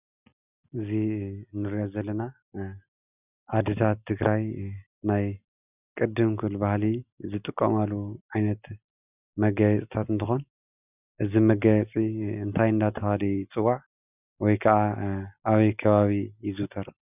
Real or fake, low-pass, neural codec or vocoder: real; 3.6 kHz; none